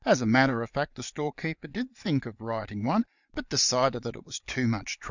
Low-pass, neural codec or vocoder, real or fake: 7.2 kHz; vocoder, 22.05 kHz, 80 mel bands, Vocos; fake